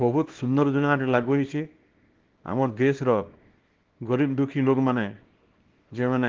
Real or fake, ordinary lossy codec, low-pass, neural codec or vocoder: fake; Opus, 16 kbps; 7.2 kHz; codec, 24 kHz, 0.9 kbps, WavTokenizer, small release